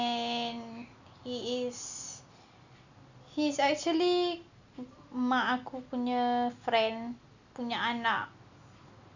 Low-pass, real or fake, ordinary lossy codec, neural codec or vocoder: 7.2 kHz; real; none; none